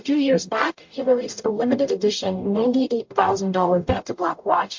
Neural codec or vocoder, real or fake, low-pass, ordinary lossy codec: codec, 44.1 kHz, 0.9 kbps, DAC; fake; 7.2 kHz; MP3, 48 kbps